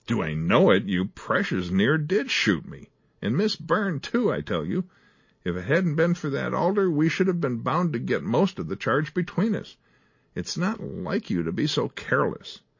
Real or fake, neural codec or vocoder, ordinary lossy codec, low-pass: real; none; MP3, 32 kbps; 7.2 kHz